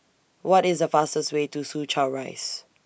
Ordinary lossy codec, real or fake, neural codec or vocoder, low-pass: none; real; none; none